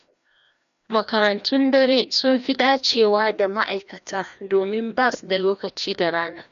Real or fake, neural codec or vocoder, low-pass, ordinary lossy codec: fake; codec, 16 kHz, 1 kbps, FreqCodec, larger model; 7.2 kHz; none